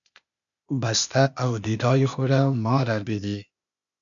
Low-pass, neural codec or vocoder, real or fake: 7.2 kHz; codec, 16 kHz, 0.8 kbps, ZipCodec; fake